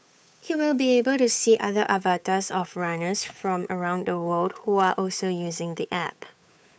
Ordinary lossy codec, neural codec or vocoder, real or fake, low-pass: none; codec, 16 kHz, 8 kbps, FunCodec, trained on Chinese and English, 25 frames a second; fake; none